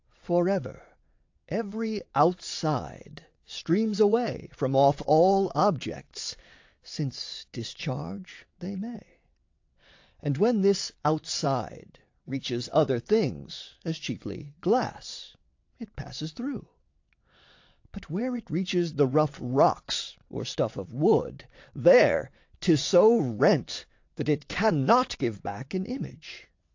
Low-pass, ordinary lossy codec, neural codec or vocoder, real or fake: 7.2 kHz; AAC, 48 kbps; vocoder, 22.05 kHz, 80 mel bands, WaveNeXt; fake